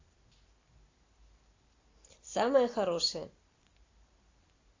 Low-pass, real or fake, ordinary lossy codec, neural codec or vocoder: 7.2 kHz; real; AAC, 32 kbps; none